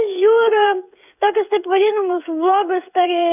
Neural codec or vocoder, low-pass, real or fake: codec, 16 kHz, 16 kbps, FreqCodec, smaller model; 3.6 kHz; fake